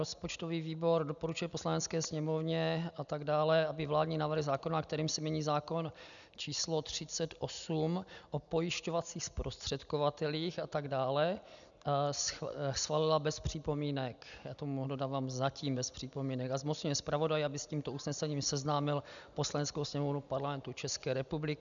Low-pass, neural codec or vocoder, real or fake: 7.2 kHz; none; real